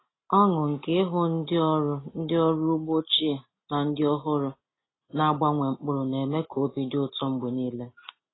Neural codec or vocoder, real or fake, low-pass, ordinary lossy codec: none; real; 7.2 kHz; AAC, 16 kbps